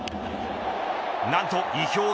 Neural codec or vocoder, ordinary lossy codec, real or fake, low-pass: none; none; real; none